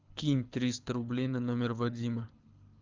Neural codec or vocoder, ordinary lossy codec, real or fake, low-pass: codec, 24 kHz, 6 kbps, HILCodec; Opus, 32 kbps; fake; 7.2 kHz